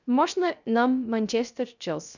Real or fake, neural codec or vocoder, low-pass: fake; codec, 16 kHz, 0.3 kbps, FocalCodec; 7.2 kHz